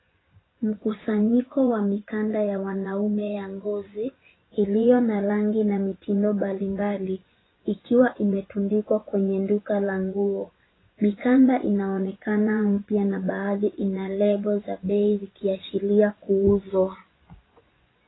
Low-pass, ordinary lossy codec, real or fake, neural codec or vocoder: 7.2 kHz; AAC, 16 kbps; fake; vocoder, 24 kHz, 100 mel bands, Vocos